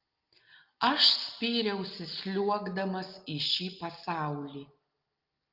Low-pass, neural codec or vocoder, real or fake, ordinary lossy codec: 5.4 kHz; none; real; Opus, 24 kbps